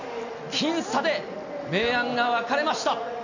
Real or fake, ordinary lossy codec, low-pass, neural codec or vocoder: real; none; 7.2 kHz; none